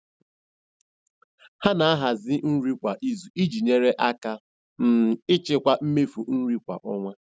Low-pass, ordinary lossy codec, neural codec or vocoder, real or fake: none; none; none; real